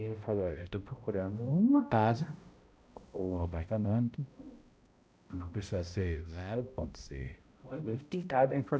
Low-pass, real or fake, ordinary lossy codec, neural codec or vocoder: none; fake; none; codec, 16 kHz, 0.5 kbps, X-Codec, HuBERT features, trained on balanced general audio